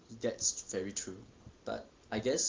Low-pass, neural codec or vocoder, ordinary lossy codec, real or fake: 7.2 kHz; none; Opus, 32 kbps; real